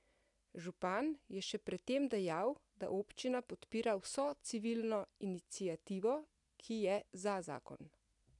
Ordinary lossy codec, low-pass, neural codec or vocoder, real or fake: none; 10.8 kHz; none; real